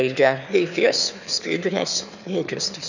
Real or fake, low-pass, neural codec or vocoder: fake; 7.2 kHz; autoencoder, 22.05 kHz, a latent of 192 numbers a frame, VITS, trained on one speaker